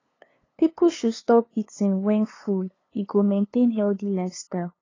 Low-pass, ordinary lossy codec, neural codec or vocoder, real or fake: 7.2 kHz; AAC, 32 kbps; codec, 16 kHz, 2 kbps, FunCodec, trained on LibriTTS, 25 frames a second; fake